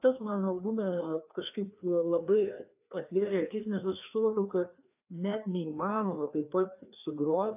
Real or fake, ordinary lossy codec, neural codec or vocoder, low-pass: fake; AAC, 32 kbps; codec, 16 kHz, 2 kbps, FreqCodec, larger model; 3.6 kHz